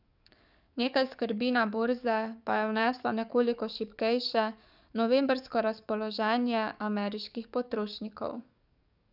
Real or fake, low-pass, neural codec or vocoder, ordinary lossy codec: fake; 5.4 kHz; codec, 44.1 kHz, 7.8 kbps, DAC; none